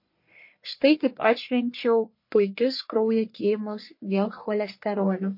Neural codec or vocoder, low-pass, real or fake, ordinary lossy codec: codec, 44.1 kHz, 1.7 kbps, Pupu-Codec; 5.4 kHz; fake; MP3, 32 kbps